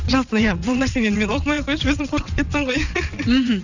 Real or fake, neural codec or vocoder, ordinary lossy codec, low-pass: fake; vocoder, 22.05 kHz, 80 mel bands, WaveNeXt; none; 7.2 kHz